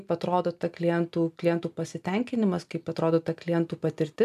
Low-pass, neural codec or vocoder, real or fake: 14.4 kHz; none; real